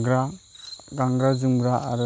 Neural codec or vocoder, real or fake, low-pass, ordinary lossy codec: none; real; none; none